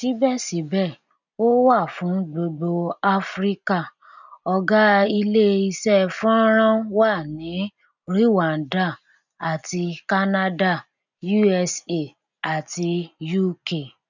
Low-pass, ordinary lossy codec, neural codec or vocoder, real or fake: 7.2 kHz; none; none; real